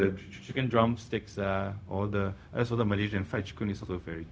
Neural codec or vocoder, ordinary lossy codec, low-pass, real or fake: codec, 16 kHz, 0.4 kbps, LongCat-Audio-Codec; none; none; fake